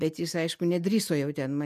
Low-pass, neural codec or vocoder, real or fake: 14.4 kHz; none; real